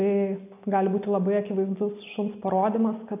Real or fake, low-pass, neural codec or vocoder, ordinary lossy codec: real; 3.6 kHz; none; AAC, 24 kbps